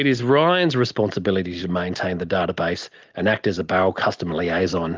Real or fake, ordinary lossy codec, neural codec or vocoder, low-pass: real; Opus, 32 kbps; none; 7.2 kHz